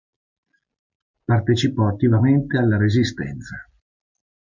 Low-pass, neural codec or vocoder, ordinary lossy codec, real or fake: 7.2 kHz; none; MP3, 64 kbps; real